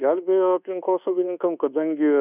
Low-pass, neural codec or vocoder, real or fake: 3.6 kHz; codec, 24 kHz, 1.2 kbps, DualCodec; fake